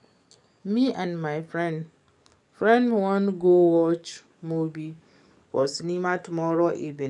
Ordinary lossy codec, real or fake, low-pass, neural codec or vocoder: none; fake; 10.8 kHz; codec, 44.1 kHz, 7.8 kbps, DAC